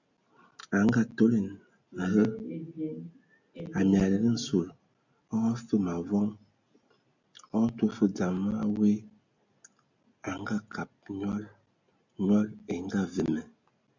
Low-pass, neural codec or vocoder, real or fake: 7.2 kHz; none; real